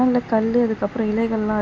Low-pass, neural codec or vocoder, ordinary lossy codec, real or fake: none; none; none; real